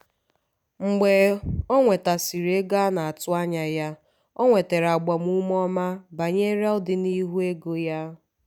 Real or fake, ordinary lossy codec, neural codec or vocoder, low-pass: real; none; none; 19.8 kHz